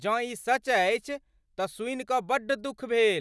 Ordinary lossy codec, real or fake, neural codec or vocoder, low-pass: none; real; none; none